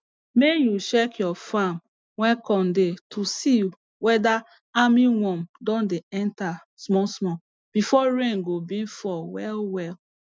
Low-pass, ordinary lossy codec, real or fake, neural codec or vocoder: none; none; real; none